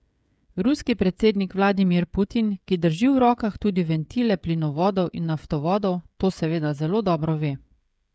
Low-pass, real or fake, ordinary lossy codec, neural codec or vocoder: none; fake; none; codec, 16 kHz, 16 kbps, FreqCodec, smaller model